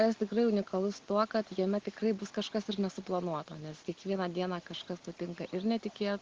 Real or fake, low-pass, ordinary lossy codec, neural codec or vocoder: real; 7.2 kHz; Opus, 16 kbps; none